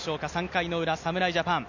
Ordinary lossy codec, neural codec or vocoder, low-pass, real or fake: none; none; 7.2 kHz; real